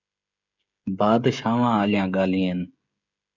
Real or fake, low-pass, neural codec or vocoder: fake; 7.2 kHz; codec, 16 kHz, 16 kbps, FreqCodec, smaller model